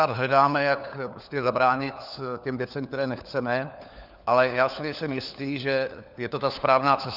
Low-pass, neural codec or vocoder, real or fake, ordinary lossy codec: 5.4 kHz; codec, 16 kHz, 4 kbps, FunCodec, trained on LibriTTS, 50 frames a second; fake; Opus, 64 kbps